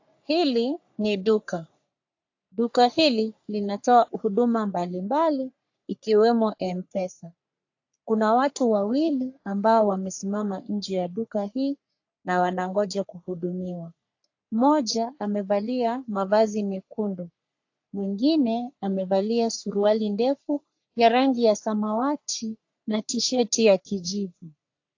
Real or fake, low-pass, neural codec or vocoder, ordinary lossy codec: fake; 7.2 kHz; codec, 44.1 kHz, 3.4 kbps, Pupu-Codec; AAC, 48 kbps